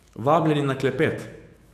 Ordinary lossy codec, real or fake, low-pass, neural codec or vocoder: AAC, 64 kbps; fake; 14.4 kHz; autoencoder, 48 kHz, 128 numbers a frame, DAC-VAE, trained on Japanese speech